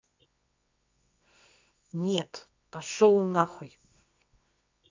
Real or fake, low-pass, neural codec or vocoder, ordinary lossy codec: fake; 7.2 kHz; codec, 24 kHz, 0.9 kbps, WavTokenizer, medium music audio release; MP3, 64 kbps